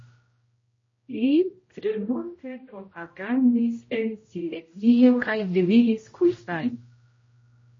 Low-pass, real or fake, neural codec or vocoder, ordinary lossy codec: 7.2 kHz; fake; codec, 16 kHz, 0.5 kbps, X-Codec, HuBERT features, trained on general audio; MP3, 32 kbps